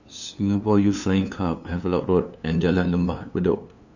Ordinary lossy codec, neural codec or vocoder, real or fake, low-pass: none; codec, 16 kHz, 2 kbps, FunCodec, trained on LibriTTS, 25 frames a second; fake; 7.2 kHz